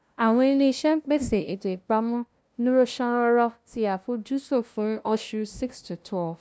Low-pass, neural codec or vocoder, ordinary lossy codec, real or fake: none; codec, 16 kHz, 0.5 kbps, FunCodec, trained on LibriTTS, 25 frames a second; none; fake